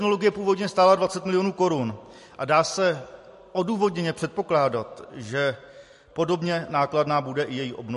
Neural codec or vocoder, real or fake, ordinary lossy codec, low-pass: none; real; MP3, 48 kbps; 14.4 kHz